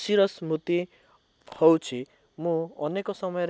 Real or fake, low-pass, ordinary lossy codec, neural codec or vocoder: real; none; none; none